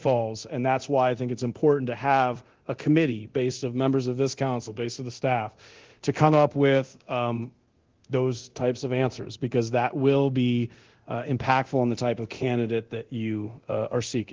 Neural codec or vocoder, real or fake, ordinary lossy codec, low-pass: codec, 24 kHz, 0.9 kbps, DualCodec; fake; Opus, 16 kbps; 7.2 kHz